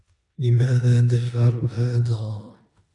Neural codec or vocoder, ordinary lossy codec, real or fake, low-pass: codec, 16 kHz in and 24 kHz out, 0.9 kbps, LongCat-Audio-Codec, four codebook decoder; AAC, 48 kbps; fake; 10.8 kHz